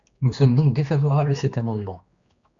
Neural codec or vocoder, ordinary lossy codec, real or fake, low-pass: codec, 16 kHz, 2 kbps, X-Codec, HuBERT features, trained on general audio; Opus, 64 kbps; fake; 7.2 kHz